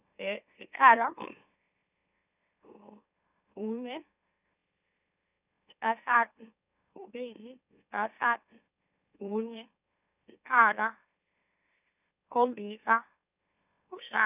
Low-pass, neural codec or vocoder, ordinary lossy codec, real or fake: 3.6 kHz; autoencoder, 44.1 kHz, a latent of 192 numbers a frame, MeloTTS; none; fake